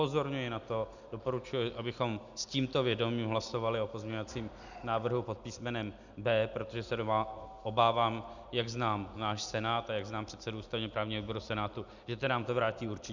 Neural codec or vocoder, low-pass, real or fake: none; 7.2 kHz; real